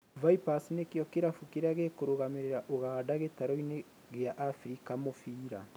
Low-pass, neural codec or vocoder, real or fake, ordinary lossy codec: none; none; real; none